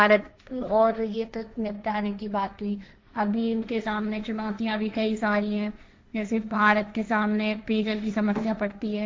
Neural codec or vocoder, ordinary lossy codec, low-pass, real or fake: codec, 16 kHz, 1.1 kbps, Voila-Tokenizer; none; none; fake